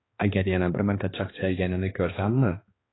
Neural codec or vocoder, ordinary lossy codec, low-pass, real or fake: codec, 16 kHz, 2 kbps, X-Codec, HuBERT features, trained on balanced general audio; AAC, 16 kbps; 7.2 kHz; fake